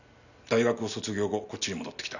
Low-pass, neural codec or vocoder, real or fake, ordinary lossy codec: 7.2 kHz; none; real; none